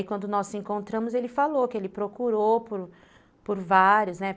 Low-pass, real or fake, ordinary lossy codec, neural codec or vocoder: none; real; none; none